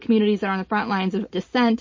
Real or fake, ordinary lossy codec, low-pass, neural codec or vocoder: real; MP3, 32 kbps; 7.2 kHz; none